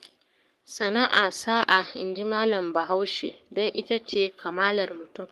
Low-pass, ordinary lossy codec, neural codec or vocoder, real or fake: 14.4 kHz; Opus, 32 kbps; codec, 44.1 kHz, 3.4 kbps, Pupu-Codec; fake